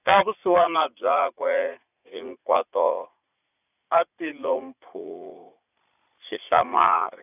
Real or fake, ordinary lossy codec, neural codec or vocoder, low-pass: fake; none; vocoder, 44.1 kHz, 80 mel bands, Vocos; 3.6 kHz